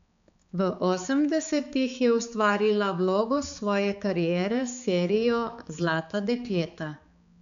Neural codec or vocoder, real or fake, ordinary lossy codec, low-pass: codec, 16 kHz, 4 kbps, X-Codec, HuBERT features, trained on balanced general audio; fake; none; 7.2 kHz